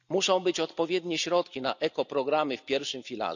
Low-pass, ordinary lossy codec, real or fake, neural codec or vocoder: 7.2 kHz; none; real; none